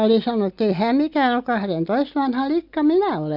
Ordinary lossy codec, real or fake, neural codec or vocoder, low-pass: none; real; none; 5.4 kHz